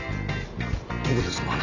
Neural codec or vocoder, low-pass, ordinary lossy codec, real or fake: none; 7.2 kHz; none; real